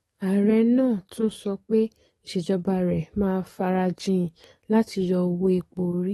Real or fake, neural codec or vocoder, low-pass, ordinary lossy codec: fake; codec, 44.1 kHz, 7.8 kbps, DAC; 19.8 kHz; AAC, 32 kbps